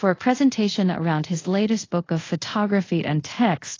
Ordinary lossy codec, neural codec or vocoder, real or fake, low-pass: AAC, 32 kbps; codec, 24 kHz, 0.5 kbps, DualCodec; fake; 7.2 kHz